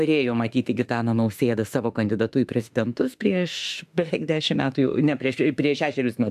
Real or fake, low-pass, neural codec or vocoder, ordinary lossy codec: fake; 14.4 kHz; autoencoder, 48 kHz, 32 numbers a frame, DAC-VAE, trained on Japanese speech; AAC, 96 kbps